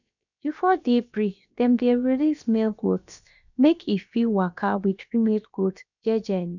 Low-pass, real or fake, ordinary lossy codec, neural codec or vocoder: 7.2 kHz; fake; none; codec, 16 kHz, about 1 kbps, DyCAST, with the encoder's durations